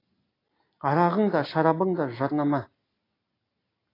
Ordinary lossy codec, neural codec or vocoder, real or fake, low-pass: AAC, 24 kbps; none; real; 5.4 kHz